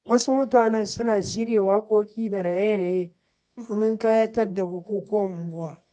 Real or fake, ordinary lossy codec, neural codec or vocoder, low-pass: fake; none; codec, 24 kHz, 0.9 kbps, WavTokenizer, medium music audio release; none